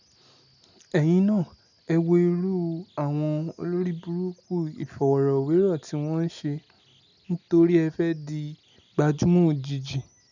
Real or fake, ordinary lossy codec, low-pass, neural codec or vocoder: real; none; 7.2 kHz; none